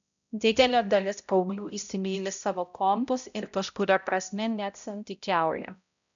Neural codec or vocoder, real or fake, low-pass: codec, 16 kHz, 0.5 kbps, X-Codec, HuBERT features, trained on balanced general audio; fake; 7.2 kHz